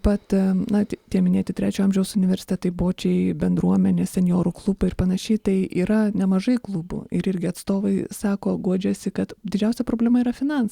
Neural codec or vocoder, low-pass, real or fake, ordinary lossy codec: none; 19.8 kHz; real; Opus, 64 kbps